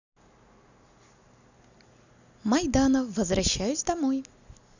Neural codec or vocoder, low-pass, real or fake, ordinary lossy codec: none; 7.2 kHz; real; none